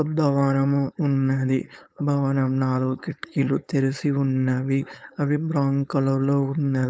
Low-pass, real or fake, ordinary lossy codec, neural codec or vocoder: none; fake; none; codec, 16 kHz, 4.8 kbps, FACodec